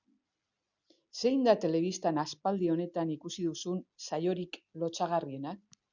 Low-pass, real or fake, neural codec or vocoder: 7.2 kHz; real; none